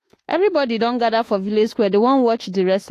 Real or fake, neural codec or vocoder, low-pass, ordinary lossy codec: fake; autoencoder, 48 kHz, 32 numbers a frame, DAC-VAE, trained on Japanese speech; 14.4 kHz; AAC, 48 kbps